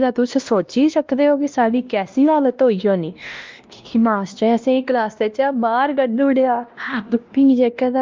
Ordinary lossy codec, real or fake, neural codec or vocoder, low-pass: Opus, 24 kbps; fake; codec, 16 kHz, 1 kbps, X-Codec, WavLM features, trained on Multilingual LibriSpeech; 7.2 kHz